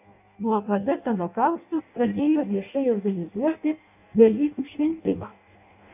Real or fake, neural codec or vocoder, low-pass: fake; codec, 16 kHz in and 24 kHz out, 0.6 kbps, FireRedTTS-2 codec; 3.6 kHz